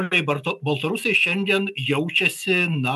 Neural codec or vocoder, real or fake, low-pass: none; real; 14.4 kHz